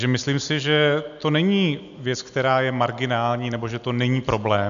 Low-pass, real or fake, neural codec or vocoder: 7.2 kHz; real; none